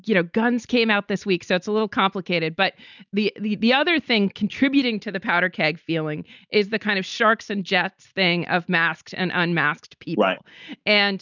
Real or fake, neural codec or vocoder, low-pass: real; none; 7.2 kHz